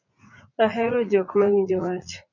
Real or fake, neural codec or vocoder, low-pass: fake; vocoder, 44.1 kHz, 80 mel bands, Vocos; 7.2 kHz